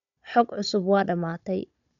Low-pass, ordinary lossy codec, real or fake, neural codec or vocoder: 7.2 kHz; none; fake; codec, 16 kHz, 16 kbps, FunCodec, trained on Chinese and English, 50 frames a second